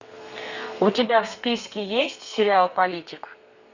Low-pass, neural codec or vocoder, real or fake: 7.2 kHz; codec, 32 kHz, 1.9 kbps, SNAC; fake